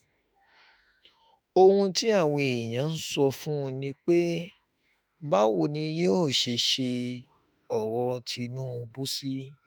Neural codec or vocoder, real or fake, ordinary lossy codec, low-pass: autoencoder, 48 kHz, 32 numbers a frame, DAC-VAE, trained on Japanese speech; fake; none; none